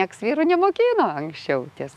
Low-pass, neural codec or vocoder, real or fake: 14.4 kHz; autoencoder, 48 kHz, 128 numbers a frame, DAC-VAE, trained on Japanese speech; fake